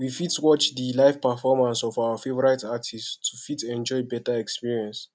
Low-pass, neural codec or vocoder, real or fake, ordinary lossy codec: none; none; real; none